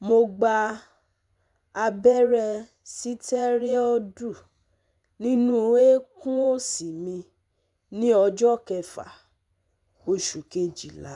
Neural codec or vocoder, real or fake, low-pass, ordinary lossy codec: vocoder, 24 kHz, 100 mel bands, Vocos; fake; 10.8 kHz; none